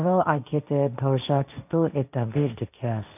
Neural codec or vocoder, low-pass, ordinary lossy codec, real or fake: codec, 16 kHz, 1.1 kbps, Voila-Tokenizer; 3.6 kHz; none; fake